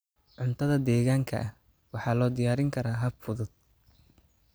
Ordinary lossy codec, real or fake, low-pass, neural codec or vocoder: none; real; none; none